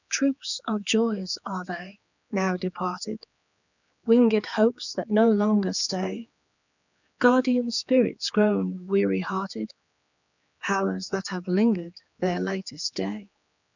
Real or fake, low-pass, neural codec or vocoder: fake; 7.2 kHz; codec, 16 kHz, 4 kbps, X-Codec, HuBERT features, trained on general audio